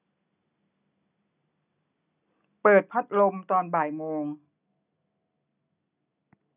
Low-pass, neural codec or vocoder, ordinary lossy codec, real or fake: 3.6 kHz; none; none; real